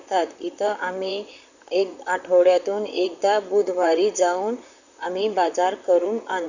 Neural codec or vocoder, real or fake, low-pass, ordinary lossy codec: vocoder, 44.1 kHz, 128 mel bands, Pupu-Vocoder; fake; 7.2 kHz; none